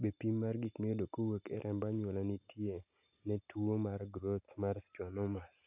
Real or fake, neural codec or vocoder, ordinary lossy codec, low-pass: real; none; none; 3.6 kHz